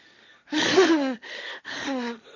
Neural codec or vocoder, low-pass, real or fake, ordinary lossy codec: codec, 16 kHz, 1.1 kbps, Voila-Tokenizer; 7.2 kHz; fake; none